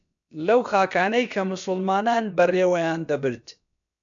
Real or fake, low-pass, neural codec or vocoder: fake; 7.2 kHz; codec, 16 kHz, about 1 kbps, DyCAST, with the encoder's durations